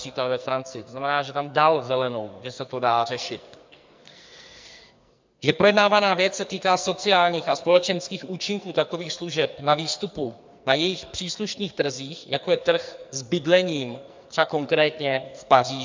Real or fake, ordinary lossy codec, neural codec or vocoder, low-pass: fake; MP3, 64 kbps; codec, 44.1 kHz, 2.6 kbps, SNAC; 7.2 kHz